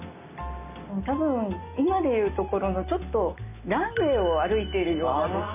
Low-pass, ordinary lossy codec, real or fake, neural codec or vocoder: 3.6 kHz; none; real; none